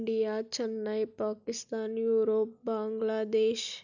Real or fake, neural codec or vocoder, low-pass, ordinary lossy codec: real; none; 7.2 kHz; none